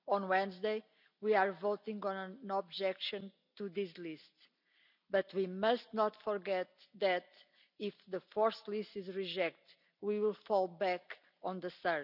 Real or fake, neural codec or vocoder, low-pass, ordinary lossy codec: real; none; 5.4 kHz; none